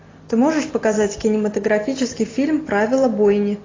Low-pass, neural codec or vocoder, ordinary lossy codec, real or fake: 7.2 kHz; none; AAC, 32 kbps; real